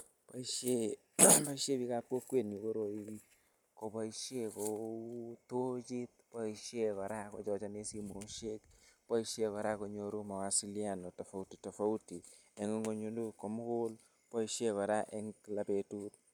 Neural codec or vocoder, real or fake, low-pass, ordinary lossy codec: none; real; none; none